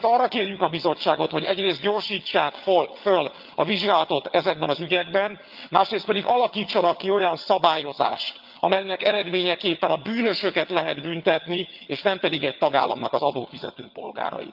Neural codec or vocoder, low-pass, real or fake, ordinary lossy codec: vocoder, 22.05 kHz, 80 mel bands, HiFi-GAN; 5.4 kHz; fake; Opus, 24 kbps